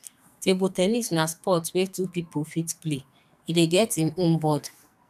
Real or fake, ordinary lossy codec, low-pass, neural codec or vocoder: fake; none; 14.4 kHz; codec, 44.1 kHz, 2.6 kbps, SNAC